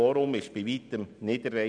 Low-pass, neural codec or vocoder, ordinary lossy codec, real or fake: 9.9 kHz; none; none; real